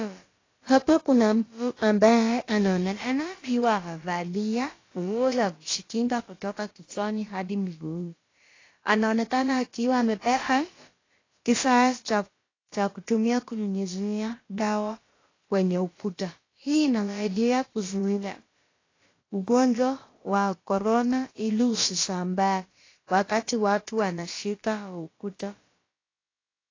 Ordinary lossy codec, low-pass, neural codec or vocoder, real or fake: AAC, 32 kbps; 7.2 kHz; codec, 16 kHz, about 1 kbps, DyCAST, with the encoder's durations; fake